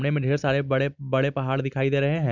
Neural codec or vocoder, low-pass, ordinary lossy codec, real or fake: none; 7.2 kHz; none; real